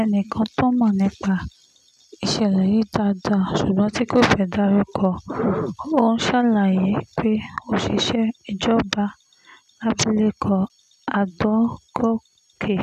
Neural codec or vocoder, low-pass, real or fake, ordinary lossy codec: none; 14.4 kHz; real; none